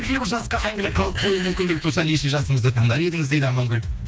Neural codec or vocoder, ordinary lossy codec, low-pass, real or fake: codec, 16 kHz, 2 kbps, FreqCodec, smaller model; none; none; fake